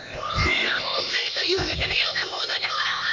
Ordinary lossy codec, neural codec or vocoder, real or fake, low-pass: MP3, 48 kbps; codec, 16 kHz, 0.8 kbps, ZipCodec; fake; 7.2 kHz